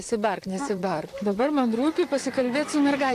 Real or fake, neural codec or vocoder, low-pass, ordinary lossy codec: fake; vocoder, 44.1 kHz, 128 mel bands, Pupu-Vocoder; 14.4 kHz; AAC, 64 kbps